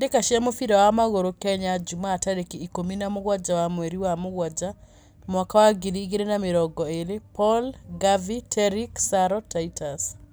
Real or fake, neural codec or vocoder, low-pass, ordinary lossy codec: real; none; none; none